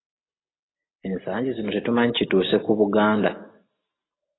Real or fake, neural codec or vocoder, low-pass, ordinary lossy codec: real; none; 7.2 kHz; AAC, 16 kbps